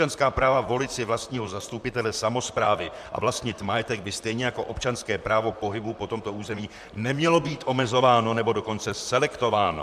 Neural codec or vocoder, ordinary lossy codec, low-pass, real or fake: vocoder, 44.1 kHz, 128 mel bands, Pupu-Vocoder; MP3, 96 kbps; 14.4 kHz; fake